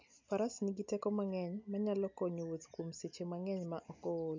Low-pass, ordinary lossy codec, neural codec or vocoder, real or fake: 7.2 kHz; MP3, 64 kbps; none; real